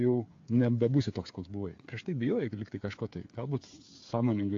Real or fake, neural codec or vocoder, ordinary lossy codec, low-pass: fake; codec, 16 kHz, 8 kbps, FreqCodec, smaller model; AAC, 48 kbps; 7.2 kHz